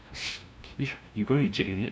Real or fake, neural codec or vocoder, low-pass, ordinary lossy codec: fake; codec, 16 kHz, 0.5 kbps, FunCodec, trained on LibriTTS, 25 frames a second; none; none